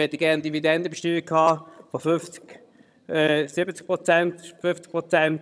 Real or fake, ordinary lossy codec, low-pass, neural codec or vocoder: fake; none; none; vocoder, 22.05 kHz, 80 mel bands, HiFi-GAN